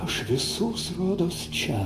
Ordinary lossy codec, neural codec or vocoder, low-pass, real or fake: AAC, 64 kbps; none; 14.4 kHz; real